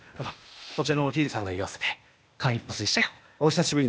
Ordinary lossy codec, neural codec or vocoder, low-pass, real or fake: none; codec, 16 kHz, 0.8 kbps, ZipCodec; none; fake